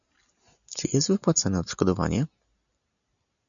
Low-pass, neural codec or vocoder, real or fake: 7.2 kHz; none; real